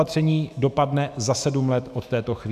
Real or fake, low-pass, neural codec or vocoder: fake; 14.4 kHz; autoencoder, 48 kHz, 128 numbers a frame, DAC-VAE, trained on Japanese speech